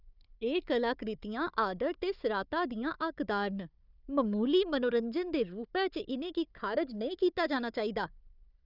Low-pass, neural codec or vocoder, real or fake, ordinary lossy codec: 5.4 kHz; codec, 16 kHz, 4 kbps, FunCodec, trained on Chinese and English, 50 frames a second; fake; none